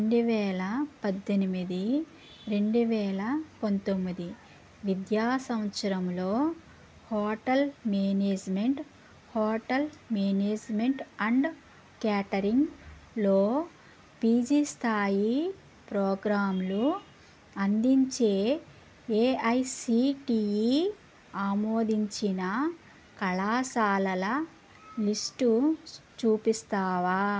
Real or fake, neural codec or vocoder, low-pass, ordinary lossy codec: real; none; none; none